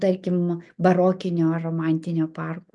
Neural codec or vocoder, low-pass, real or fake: none; 10.8 kHz; real